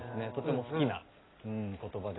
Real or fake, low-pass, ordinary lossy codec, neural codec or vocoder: real; 7.2 kHz; AAC, 16 kbps; none